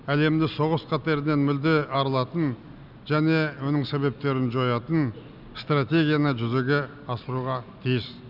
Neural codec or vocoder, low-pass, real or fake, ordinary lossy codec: none; 5.4 kHz; real; none